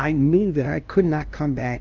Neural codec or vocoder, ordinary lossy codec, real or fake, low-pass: codec, 16 kHz, 0.5 kbps, FunCodec, trained on LibriTTS, 25 frames a second; Opus, 24 kbps; fake; 7.2 kHz